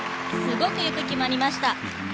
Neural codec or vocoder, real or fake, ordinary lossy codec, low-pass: none; real; none; none